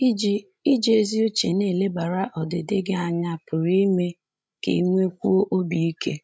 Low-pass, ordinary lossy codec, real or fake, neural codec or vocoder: none; none; fake; codec, 16 kHz, 16 kbps, FreqCodec, larger model